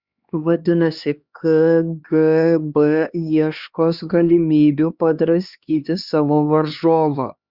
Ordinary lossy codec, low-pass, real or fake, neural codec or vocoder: Opus, 64 kbps; 5.4 kHz; fake; codec, 16 kHz, 2 kbps, X-Codec, HuBERT features, trained on LibriSpeech